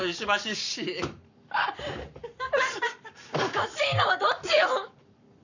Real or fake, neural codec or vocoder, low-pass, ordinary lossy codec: fake; codec, 44.1 kHz, 7.8 kbps, Pupu-Codec; 7.2 kHz; none